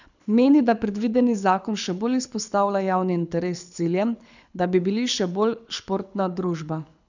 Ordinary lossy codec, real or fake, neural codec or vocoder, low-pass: none; fake; codec, 24 kHz, 6 kbps, HILCodec; 7.2 kHz